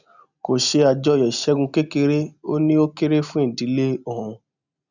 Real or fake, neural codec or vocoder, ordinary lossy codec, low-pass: real; none; none; 7.2 kHz